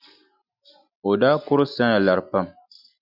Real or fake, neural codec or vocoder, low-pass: real; none; 5.4 kHz